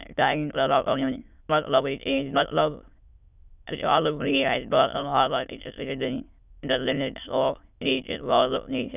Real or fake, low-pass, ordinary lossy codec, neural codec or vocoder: fake; 3.6 kHz; none; autoencoder, 22.05 kHz, a latent of 192 numbers a frame, VITS, trained on many speakers